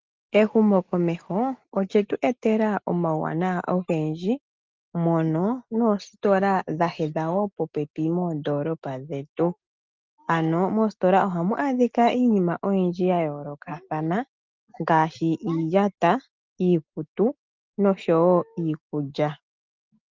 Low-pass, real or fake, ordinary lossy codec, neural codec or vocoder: 7.2 kHz; real; Opus, 24 kbps; none